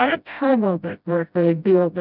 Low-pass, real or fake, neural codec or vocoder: 5.4 kHz; fake; codec, 16 kHz, 0.5 kbps, FreqCodec, smaller model